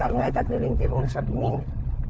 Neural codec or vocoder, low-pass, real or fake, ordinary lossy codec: codec, 16 kHz, 4 kbps, FunCodec, trained on LibriTTS, 50 frames a second; none; fake; none